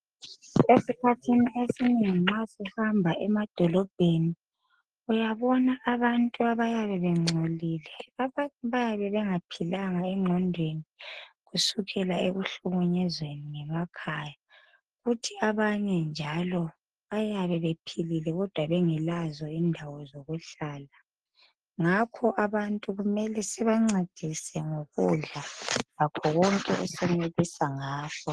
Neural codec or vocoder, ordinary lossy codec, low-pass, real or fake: none; Opus, 16 kbps; 10.8 kHz; real